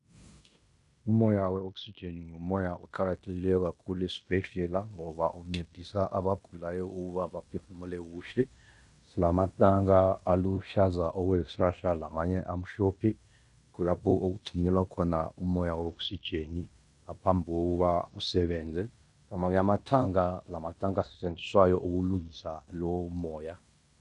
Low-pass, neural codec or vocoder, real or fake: 10.8 kHz; codec, 16 kHz in and 24 kHz out, 0.9 kbps, LongCat-Audio-Codec, fine tuned four codebook decoder; fake